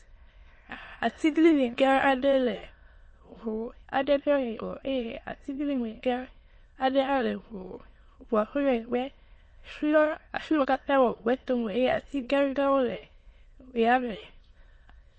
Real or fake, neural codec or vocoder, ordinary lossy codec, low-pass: fake; autoencoder, 22.05 kHz, a latent of 192 numbers a frame, VITS, trained on many speakers; MP3, 32 kbps; 9.9 kHz